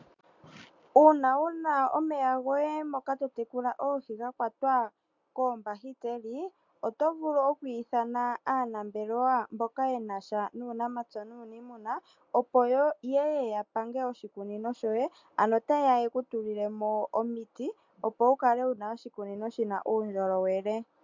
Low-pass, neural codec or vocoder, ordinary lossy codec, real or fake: 7.2 kHz; none; MP3, 64 kbps; real